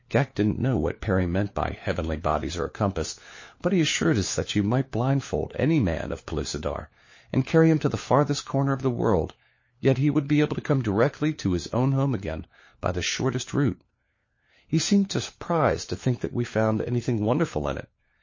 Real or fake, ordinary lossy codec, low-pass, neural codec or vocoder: fake; MP3, 32 kbps; 7.2 kHz; codec, 16 kHz, 4 kbps, FunCodec, trained on LibriTTS, 50 frames a second